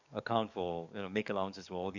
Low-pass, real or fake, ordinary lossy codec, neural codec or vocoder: 7.2 kHz; fake; none; codec, 44.1 kHz, 7.8 kbps, DAC